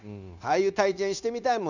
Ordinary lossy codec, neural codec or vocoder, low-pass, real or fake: none; codec, 16 kHz, 0.9 kbps, LongCat-Audio-Codec; 7.2 kHz; fake